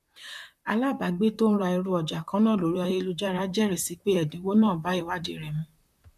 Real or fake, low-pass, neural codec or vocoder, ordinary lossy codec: fake; 14.4 kHz; vocoder, 44.1 kHz, 128 mel bands, Pupu-Vocoder; none